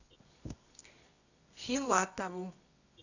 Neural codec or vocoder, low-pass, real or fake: codec, 24 kHz, 0.9 kbps, WavTokenizer, medium music audio release; 7.2 kHz; fake